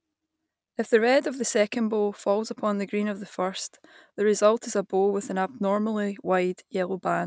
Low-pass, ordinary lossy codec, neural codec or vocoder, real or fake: none; none; none; real